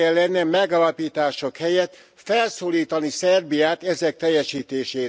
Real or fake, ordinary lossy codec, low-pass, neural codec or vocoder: real; none; none; none